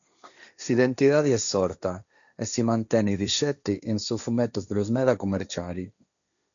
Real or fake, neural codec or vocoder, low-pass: fake; codec, 16 kHz, 1.1 kbps, Voila-Tokenizer; 7.2 kHz